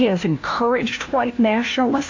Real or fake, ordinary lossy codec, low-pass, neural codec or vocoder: fake; AAC, 48 kbps; 7.2 kHz; codec, 16 kHz, 1 kbps, FunCodec, trained on LibriTTS, 50 frames a second